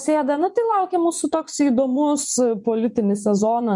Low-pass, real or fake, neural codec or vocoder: 10.8 kHz; fake; vocoder, 24 kHz, 100 mel bands, Vocos